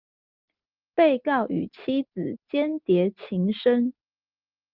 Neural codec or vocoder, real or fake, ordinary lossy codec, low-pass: none; real; Opus, 32 kbps; 5.4 kHz